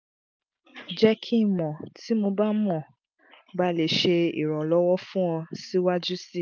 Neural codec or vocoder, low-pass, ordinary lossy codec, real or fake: none; none; none; real